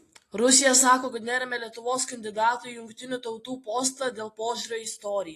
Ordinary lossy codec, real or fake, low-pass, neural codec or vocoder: AAC, 48 kbps; real; 14.4 kHz; none